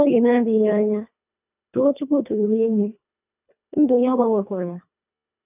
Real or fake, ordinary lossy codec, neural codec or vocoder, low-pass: fake; none; codec, 24 kHz, 1.5 kbps, HILCodec; 3.6 kHz